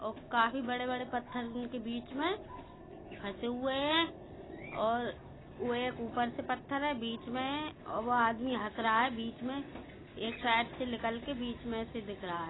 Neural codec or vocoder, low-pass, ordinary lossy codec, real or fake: none; 7.2 kHz; AAC, 16 kbps; real